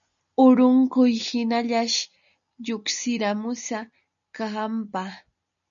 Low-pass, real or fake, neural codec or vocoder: 7.2 kHz; real; none